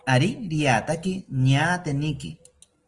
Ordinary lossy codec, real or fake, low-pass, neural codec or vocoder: Opus, 24 kbps; real; 10.8 kHz; none